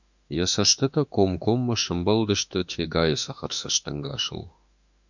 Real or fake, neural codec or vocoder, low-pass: fake; autoencoder, 48 kHz, 32 numbers a frame, DAC-VAE, trained on Japanese speech; 7.2 kHz